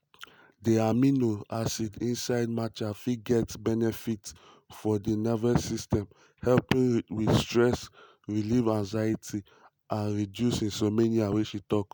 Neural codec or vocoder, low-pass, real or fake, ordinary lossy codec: none; none; real; none